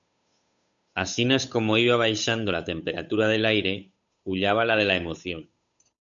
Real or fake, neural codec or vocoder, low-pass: fake; codec, 16 kHz, 8 kbps, FunCodec, trained on Chinese and English, 25 frames a second; 7.2 kHz